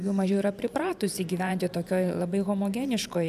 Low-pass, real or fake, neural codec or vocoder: 14.4 kHz; fake; vocoder, 44.1 kHz, 128 mel bands every 512 samples, BigVGAN v2